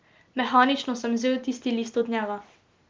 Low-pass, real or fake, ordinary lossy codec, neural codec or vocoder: 7.2 kHz; real; Opus, 24 kbps; none